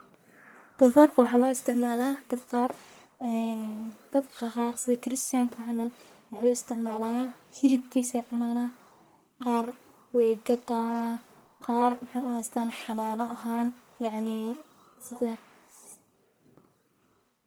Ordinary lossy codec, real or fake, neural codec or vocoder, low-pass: none; fake; codec, 44.1 kHz, 1.7 kbps, Pupu-Codec; none